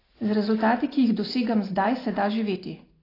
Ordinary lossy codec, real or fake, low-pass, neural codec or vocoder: AAC, 24 kbps; real; 5.4 kHz; none